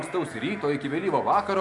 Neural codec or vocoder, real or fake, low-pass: none; real; 10.8 kHz